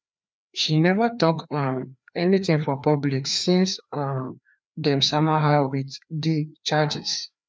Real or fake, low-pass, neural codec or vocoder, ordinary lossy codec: fake; none; codec, 16 kHz, 2 kbps, FreqCodec, larger model; none